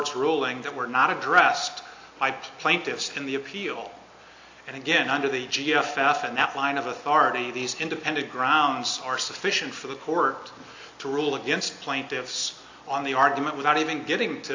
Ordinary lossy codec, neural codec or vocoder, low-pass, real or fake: AAC, 48 kbps; none; 7.2 kHz; real